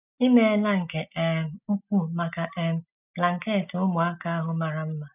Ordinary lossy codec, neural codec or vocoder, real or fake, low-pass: none; none; real; 3.6 kHz